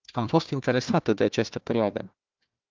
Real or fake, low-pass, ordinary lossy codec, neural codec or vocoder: fake; 7.2 kHz; Opus, 24 kbps; codec, 16 kHz, 1 kbps, FunCodec, trained on Chinese and English, 50 frames a second